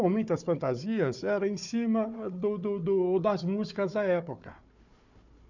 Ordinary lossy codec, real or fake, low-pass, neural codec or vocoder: none; fake; 7.2 kHz; codec, 16 kHz, 4 kbps, FunCodec, trained on Chinese and English, 50 frames a second